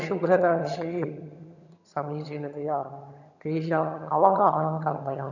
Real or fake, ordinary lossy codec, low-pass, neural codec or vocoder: fake; none; 7.2 kHz; vocoder, 22.05 kHz, 80 mel bands, HiFi-GAN